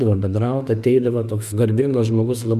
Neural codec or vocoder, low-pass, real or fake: autoencoder, 48 kHz, 32 numbers a frame, DAC-VAE, trained on Japanese speech; 14.4 kHz; fake